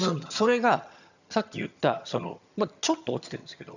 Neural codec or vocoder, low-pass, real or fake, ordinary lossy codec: vocoder, 22.05 kHz, 80 mel bands, HiFi-GAN; 7.2 kHz; fake; none